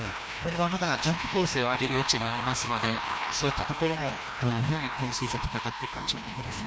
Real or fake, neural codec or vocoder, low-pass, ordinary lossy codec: fake; codec, 16 kHz, 1 kbps, FreqCodec, larger model; none; none